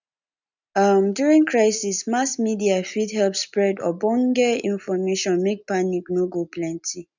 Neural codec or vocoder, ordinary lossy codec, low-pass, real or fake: none; none; 7.2 kHz; real